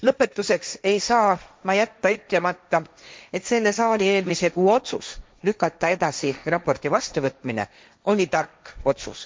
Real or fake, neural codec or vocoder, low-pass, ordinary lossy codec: fake; codec, 16 kHz, 1.1 kbps, Voila-Tokenizer; none; none